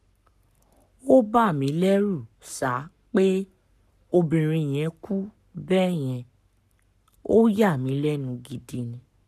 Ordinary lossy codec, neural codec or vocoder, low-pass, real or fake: none; codec, 44.1 kHz, 7.8 kbps, Pupu-Codec; 14.4 kHz; fake